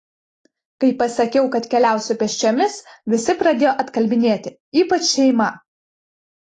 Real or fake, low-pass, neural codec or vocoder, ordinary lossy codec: real; 10.8 kHz; none; AAC, 32 kbps